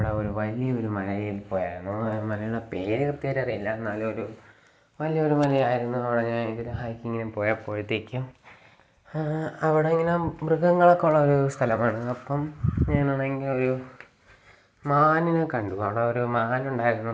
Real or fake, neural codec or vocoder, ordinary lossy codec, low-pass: real; none; none; none